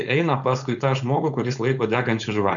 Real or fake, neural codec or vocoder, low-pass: fake; codec, 16 kHz, 4.8 kbps, FACodec; 7.2 kHz